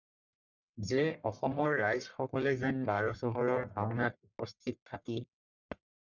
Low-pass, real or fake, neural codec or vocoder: 7.2 kHz; fake; codec, 44.1 kHz, 1.7 kbps, Pupu-Codec